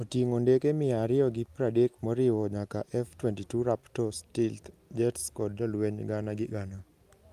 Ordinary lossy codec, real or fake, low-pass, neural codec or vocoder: Opus, 32 kbps; real; 14.4 kHz; none